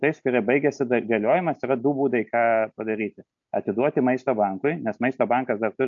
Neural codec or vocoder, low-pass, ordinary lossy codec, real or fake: none; 7.2 kHz; AAC, 64 kbps; real